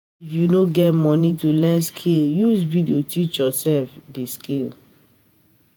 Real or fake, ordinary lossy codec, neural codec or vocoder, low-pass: fake; none; vocoder, 48 kHz, 128 mel bands, Vocos; none